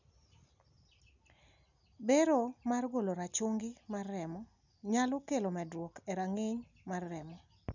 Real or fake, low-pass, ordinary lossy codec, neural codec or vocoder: real; 7.2 kHz; none; none